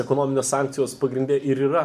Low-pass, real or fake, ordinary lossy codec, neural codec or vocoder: 14.4 kHz; real; MP3, 96 kbps; none